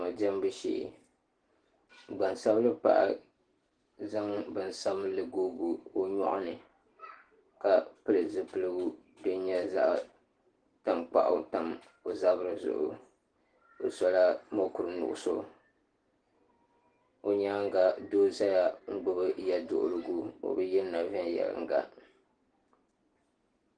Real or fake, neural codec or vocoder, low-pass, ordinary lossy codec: real; none; 9.9 kHz; Opus, 16 kbps